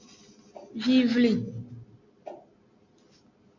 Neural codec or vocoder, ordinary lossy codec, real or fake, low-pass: none; MP3, 48 kbps; real; 7.2 kHz